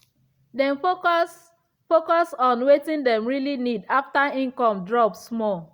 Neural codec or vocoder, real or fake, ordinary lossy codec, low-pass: none; real; Opus, 64 kbps; 19.8 kHz